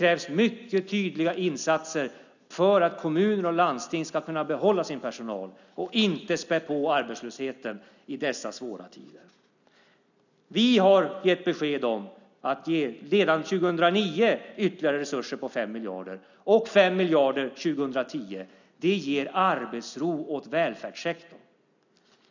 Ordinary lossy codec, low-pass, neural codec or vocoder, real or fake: none; 7.2 kHz; none; real